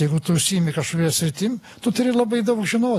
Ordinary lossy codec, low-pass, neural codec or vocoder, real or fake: AAC, 48 kbps; 14.4 kHz; none; real